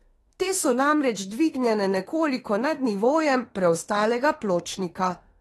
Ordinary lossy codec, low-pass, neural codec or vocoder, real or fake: AAC, 32 kbps; 19.8 kHz; autoencoder, 48 kHz, 32 numbers a frame, DAC-VAE, trained on Japanese speech; fake